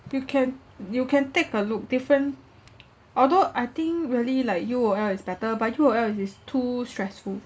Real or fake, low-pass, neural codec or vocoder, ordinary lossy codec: real; none; none; none